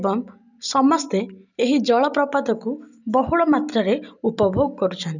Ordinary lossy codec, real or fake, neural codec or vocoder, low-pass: none; real; none; 7.2 kHz